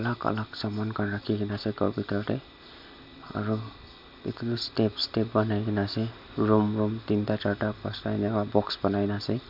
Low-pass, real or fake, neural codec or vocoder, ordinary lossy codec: 5.4 kHz; real; none; MP3, 48 kbps